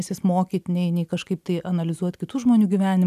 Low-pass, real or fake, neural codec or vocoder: 14.4 kHz; real; none